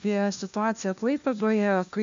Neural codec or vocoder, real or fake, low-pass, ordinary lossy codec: codec, 16 kHz, 1 kbps, FunCodec, trained on LibriTTS, 50 frames a second; fake; 7.2 kHz; AAC, 64 kbps